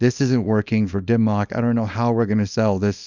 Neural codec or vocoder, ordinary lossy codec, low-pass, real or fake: codec, 24 kHz, 0.9 kbps, WavTokenizer, small release; Opus, 64 kbps; 7.2 kHz; fake